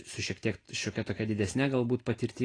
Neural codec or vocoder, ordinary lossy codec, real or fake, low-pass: none; AAC, 32 kbps; real; 9.9 kHz